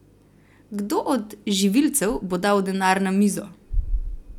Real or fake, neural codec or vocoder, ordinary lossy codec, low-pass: real; none; none; 19.8 kHz